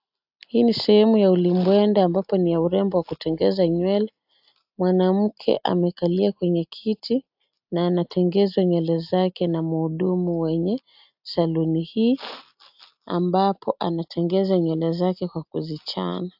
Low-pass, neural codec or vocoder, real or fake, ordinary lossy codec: 5.4 kHz; none; real; AAC, 48 kbps